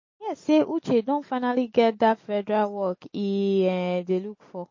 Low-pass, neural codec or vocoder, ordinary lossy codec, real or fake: 7.2 kHz; none; MP3, 32 kbps; real